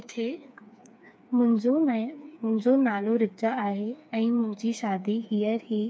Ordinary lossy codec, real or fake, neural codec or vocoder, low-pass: none; fake; codec, 16 kHz, 4 kbps, FreqCodec, smaller model; none